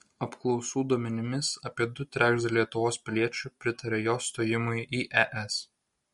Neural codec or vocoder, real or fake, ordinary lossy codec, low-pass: none; real; MP3, 48 kbps; 19.8 kHz